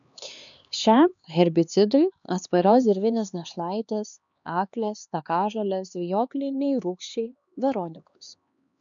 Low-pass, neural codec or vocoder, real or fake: 7.2 kHz; codec, 16 kHz, 2 kbps, X-Codec, HuBERT features, trained on LibriSpeech; fake